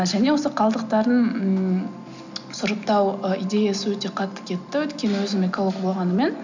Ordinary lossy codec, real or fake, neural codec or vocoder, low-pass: none; real; none; 7.2 kHz